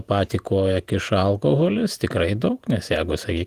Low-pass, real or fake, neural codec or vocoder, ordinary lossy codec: 14.4 kHz; fake; vocoder, 48 kHz, 128 mel bands, Vocos; Opus, 24 kbps